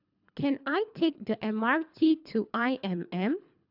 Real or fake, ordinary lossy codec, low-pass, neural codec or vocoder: fake; none; 5.4 kHz; codec, 24 kHz, 3 kbps, HILCodec